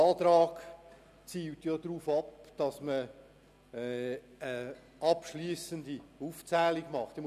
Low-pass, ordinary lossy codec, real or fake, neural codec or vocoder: 14.4 kHz; none; real; none